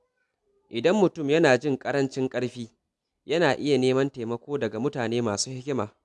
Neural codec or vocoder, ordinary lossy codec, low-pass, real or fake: none; none; none; real